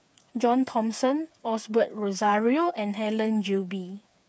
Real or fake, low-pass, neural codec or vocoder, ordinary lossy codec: fake; none; codec, 16 kHz, 8 kbps, FreqCodec, smaller model; none